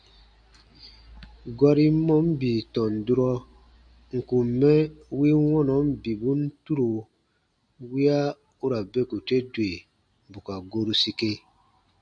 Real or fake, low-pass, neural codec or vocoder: real; 9.9 kHz; none